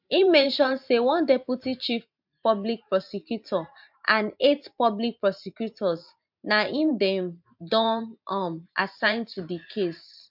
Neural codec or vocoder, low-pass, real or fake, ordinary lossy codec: vocoder, 44.1 kHz, 128 mel bands every 512 samples, BigVGAN v2; 5.4 kHz; fake; MP3, 48 kbps